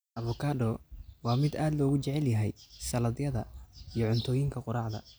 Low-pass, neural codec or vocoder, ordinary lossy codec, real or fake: none; none; none; real